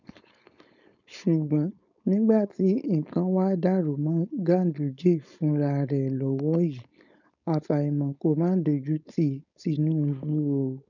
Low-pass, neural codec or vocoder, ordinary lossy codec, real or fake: 7.2 kHz; codec, 16 kHz, 4.8 kbps, FACodec; none; fake